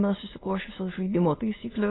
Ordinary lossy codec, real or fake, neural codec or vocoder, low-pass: AAC, 16 kbps; fake; autoencoder, 22.05 kHz, a latent of 192 numbers a frame, VITS, trained on many speakers; 7.2 kHz